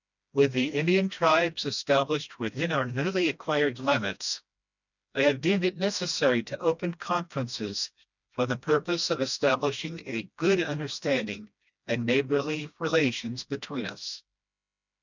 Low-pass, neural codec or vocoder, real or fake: 7.2 kHz; codec, 16 kHz, 1 kbps, FreqCodec, smaller model; fake